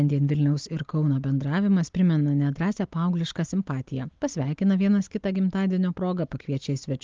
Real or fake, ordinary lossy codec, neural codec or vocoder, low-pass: real; Opus, 32 kbps; none; 7.2 kHz